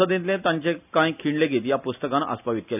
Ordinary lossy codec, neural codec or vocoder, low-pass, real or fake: none; none; 3.6 kHz; real